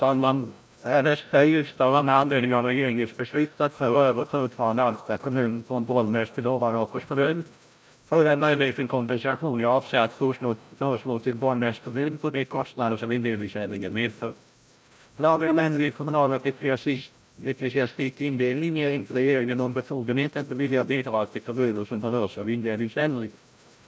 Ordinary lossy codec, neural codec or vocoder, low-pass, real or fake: none; codec, 16 kHz, 0.5 kbps, FreqCodec, larger model; none; fake